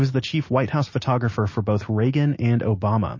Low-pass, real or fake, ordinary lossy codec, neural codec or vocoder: 7.2 kHz; real; MP3, 32 kbps; none